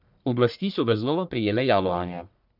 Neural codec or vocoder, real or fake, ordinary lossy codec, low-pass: codec, 44.1 kHz, 1.7 kbps, Pupu-Codec; fake; none; 5.4 kHz